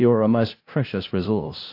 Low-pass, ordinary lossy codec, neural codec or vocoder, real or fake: 5.4 kHz; AAC, 32 kbps; codec, 16 kHz, 0.5 kbps, FunCodec, trained on LibriTTS, 25 frames a second; fake